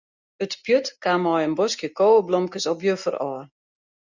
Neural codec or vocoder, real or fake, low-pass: none; real; 7.2 kHz